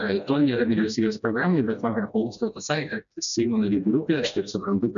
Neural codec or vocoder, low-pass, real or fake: codec, 16 kHz, 1 kbps, FreqCodec, smaller model; 7.2 kHz; fake